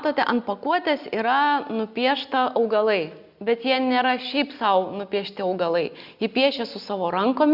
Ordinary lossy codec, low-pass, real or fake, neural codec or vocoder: Opus, 64 kbps; 5.4 kHz; real; none